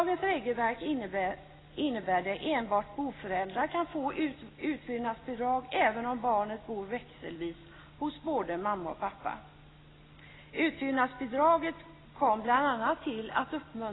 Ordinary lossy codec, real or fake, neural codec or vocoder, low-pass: AAC, 16 kbps; real; none; 7.2 kHz